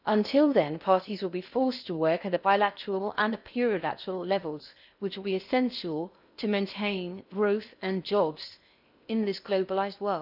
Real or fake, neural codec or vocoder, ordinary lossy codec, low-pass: fake; codec, 16 kHz in and 24 kHz out, 0.6 kbps, FocalCodec, streaming, 4096 codes; none; 5.4 kHz